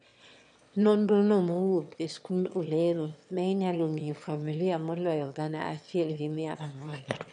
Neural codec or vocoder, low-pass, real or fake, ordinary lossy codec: autoencoder, 22.05 kHz, a latent of 192 numbers a frame, VITS, trained on one speaker; 9.9 kHz; fake; none